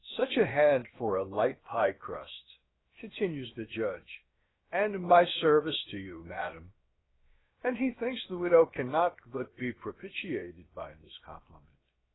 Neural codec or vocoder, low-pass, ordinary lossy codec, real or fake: codec, 16 kHz, about 1 kbps, DyCAST, with the encoder's durations; 7.2 kHz; AAC, 16 kbps; fake